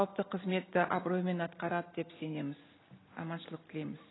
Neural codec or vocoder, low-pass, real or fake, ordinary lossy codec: none; 7.2 kHz; real; AAC, 16 kbps